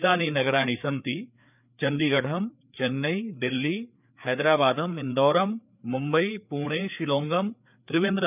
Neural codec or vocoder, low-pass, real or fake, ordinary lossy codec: codec, 16 kHz, 4 kbps, FreqCodec, larger model; 3.6 kHz; fake; none